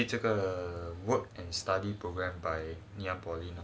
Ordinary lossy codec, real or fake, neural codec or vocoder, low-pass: none; real; none; none